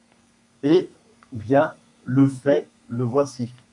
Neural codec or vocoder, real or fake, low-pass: codec, 32 kHz, 1.9 kbps, SNAC; fake; 10.8 kHz